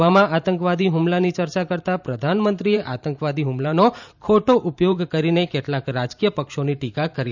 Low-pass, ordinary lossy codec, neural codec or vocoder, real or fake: 7.2 kHz; none; vocoder, 44.1 kHz, 128 mel bands every 512 samples, BigVGAN v2; fake